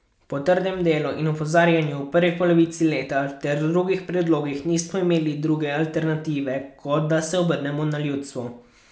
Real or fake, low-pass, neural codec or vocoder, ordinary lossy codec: real; none; none; none